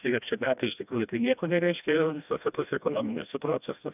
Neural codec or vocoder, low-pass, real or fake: codec, 16 kHz, 1 kbps, FreqCodec, smaller model; 3.6 kHz; fake